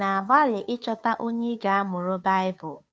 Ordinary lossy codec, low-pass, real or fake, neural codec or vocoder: none; none; fake; codec, 16 kHz, 2 kbps, FunCodec, trained on Chinese and English, 25 frames a second